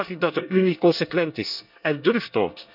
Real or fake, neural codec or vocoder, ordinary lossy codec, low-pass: fake; codec, 24 kHz, 1 kbps, SNAC; none; 5.4 kHz